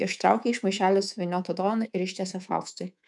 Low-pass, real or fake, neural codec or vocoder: 10.8 kHz; fake; codec, 24 kHz, 3.1 kbps, DualCodec